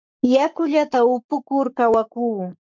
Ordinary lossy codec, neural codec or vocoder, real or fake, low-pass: MP3, 64 kbps; codec, 44.1 kHz, 7.8 kbps, Pupu-Codec; fake; 7.2 kHz